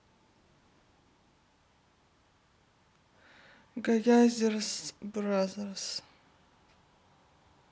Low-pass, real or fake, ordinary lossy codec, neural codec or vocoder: none; real; none; none